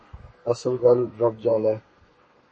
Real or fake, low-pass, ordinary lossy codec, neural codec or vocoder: fake; 10.8 kHz; MP3, 32 kbps; codec, 32 kHz, 1.9 kbps, SNAC